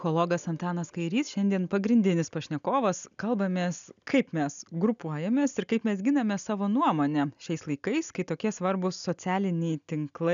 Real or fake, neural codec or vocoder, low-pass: real; none; 7.2 kHz